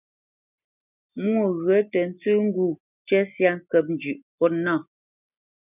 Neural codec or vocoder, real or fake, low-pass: none; real; 3.6 kHz